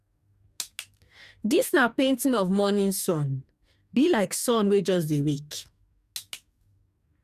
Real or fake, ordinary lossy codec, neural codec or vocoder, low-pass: fake; none; codec, 44.1 kHz, 2.6 kbps, DAC; 14.4 kHz